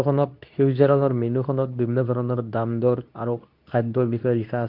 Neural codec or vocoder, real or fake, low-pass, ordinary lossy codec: codec, 24 kHz, 0.9 kbps, WavTokenizer, medium speech release version 2; fake; 5.4 kHz; Opus, 24 kbps